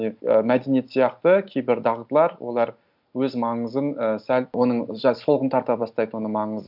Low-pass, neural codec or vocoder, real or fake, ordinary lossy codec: 5.4 kHz; none; real; none